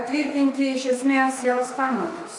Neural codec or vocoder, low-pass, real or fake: autoencoder, 48 kHz, 32 numbers a frame, DAC-VAE, trained on Japanese speech; 10.8 kHz; fake